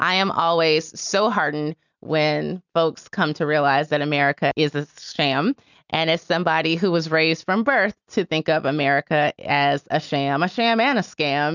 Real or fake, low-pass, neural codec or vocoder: real; 7.2 kHz; none